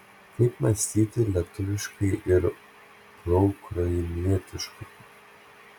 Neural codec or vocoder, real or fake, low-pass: none; real; 19.8 kHz